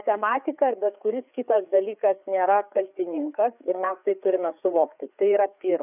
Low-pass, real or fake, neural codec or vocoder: 3.6 kHz; fake; codec, 16 kHz, 4 kbps, FreqCodec, larger model